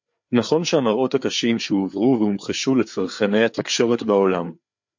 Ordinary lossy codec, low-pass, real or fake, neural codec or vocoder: MP3, 48 kbps; 7.2 kHz; fake; codec, 16 kHz, 4 kbps, FreqCodec, larger model